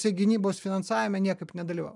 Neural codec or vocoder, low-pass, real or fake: none; 10.8 kHz; real